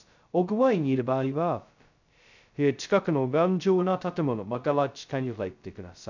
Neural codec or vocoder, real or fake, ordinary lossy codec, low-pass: codec, 16 kHz, 0.2 kbps, FocalCodec; fake; none; 7.2 kHz